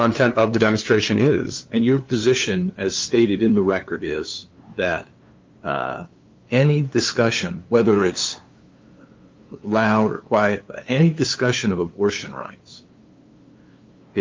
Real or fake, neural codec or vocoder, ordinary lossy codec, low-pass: fake; codec, 16 kHz, 2 kbps, FunCodec, trained on LibriTTS, 25 frames a second; Opus, 32 kbps; 7.2 kHz